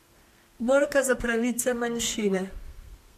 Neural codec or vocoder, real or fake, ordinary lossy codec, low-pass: codec, 32 kHz, 1.9 kbps, SNAC; fake; MP3, 64 kbps; 14.4 kHz